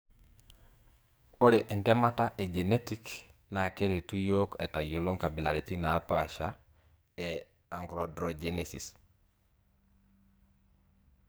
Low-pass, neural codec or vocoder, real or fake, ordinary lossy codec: none; codec, 44.1 kHz, 2.6 kbps, SNAC; fake; none